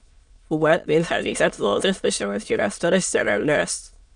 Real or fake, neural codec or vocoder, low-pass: fake; autoencoder, 22.05 kHz, a latent of 192 numbers a frame, VITS, trained on many speakers; 9.9 kHz